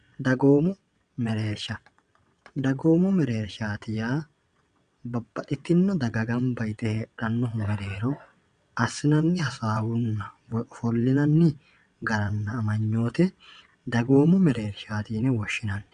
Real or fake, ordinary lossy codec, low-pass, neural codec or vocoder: fake; MP3, 96 kbps; 9.9 kHz; vocoder, 22.05 kHz, 80 mel bands, WaveNeXt